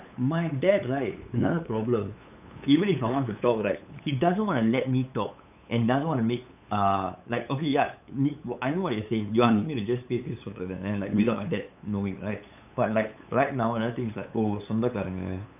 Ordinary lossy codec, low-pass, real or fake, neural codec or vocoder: none; 3.6 kHz; fake; codec, 16 kHz, 8 kbps, FunCodec, trained on LibriTTS, 25 frames a second